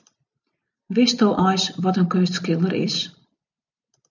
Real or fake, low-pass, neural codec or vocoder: real; 7.2 kHz; none